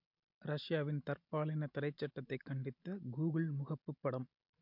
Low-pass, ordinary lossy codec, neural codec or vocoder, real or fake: 5.4 kHz; MP3, 48 kbps; none; real